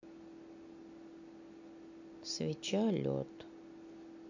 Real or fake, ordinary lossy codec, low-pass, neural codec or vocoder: real; AAC, 48 kbps; 7.2 kHz; none